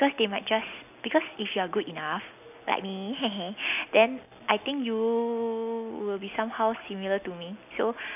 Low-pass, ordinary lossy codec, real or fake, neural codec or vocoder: 3.6 kHz; none; real; none